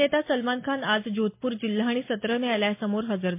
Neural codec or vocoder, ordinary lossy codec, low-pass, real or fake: none; MP3, 24 kbps; 3.6 kHz; real